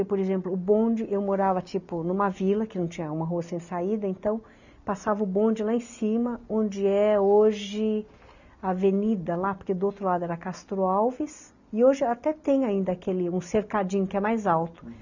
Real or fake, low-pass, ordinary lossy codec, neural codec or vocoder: real; 7.2 kHz; none; none